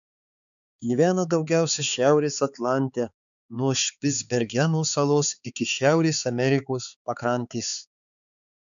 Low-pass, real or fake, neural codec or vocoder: 7.2 kHz; fake; codec, 16 kHz, 4 kbps, X-Codec, HuBERT features, trained on balanced general audio